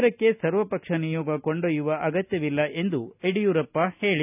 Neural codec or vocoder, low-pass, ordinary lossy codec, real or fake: none; 3.6 kHz; none; real